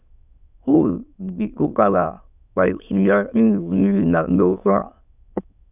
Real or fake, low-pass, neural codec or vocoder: fake; 3.6 kHz; autoencoder, 22.05 kHz, a latent of 192 numbers a frame, VITS, trained on many speakers